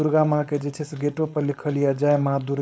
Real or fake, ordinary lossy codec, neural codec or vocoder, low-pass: fake; none; codec, 16 kHz, 4.8 kbps, FACodec; none